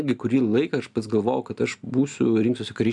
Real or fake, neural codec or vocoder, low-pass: real; none; 10.8 kHz